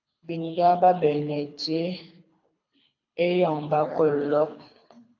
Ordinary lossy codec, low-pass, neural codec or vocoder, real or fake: AAC, 48 kbps; 7.2 kHz; codec, 24 kHz, 3 kbps, HILCodec; fake